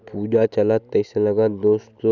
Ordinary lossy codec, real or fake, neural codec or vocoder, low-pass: none; real; none; 7.2 kHz